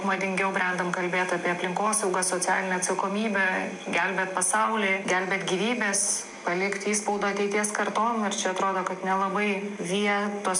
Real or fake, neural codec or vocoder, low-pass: real; none; 10.8 kHz